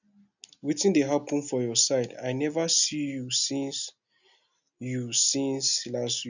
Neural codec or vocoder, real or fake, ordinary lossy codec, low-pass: none; real; none; 7.2 kHz